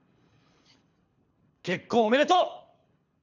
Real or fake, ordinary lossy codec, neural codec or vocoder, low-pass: fake; none; codec, 24 kHz, 3 kbps, HILCodec; 7.2 kHz